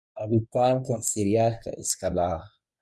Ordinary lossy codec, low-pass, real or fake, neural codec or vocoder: none; none; fake; codec, 24 kHz, 0.9 kbps, WavTokenizer, medium speech release version 1